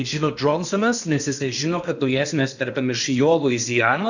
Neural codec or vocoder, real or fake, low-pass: codec, 16 kHz in and 24 kHz out, 0.8 kbps, FocalCodec, streaming, 65536 codes; fake; 7.2 kHz